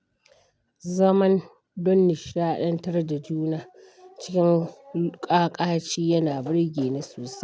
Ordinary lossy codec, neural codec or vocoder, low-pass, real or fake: none; none; none; real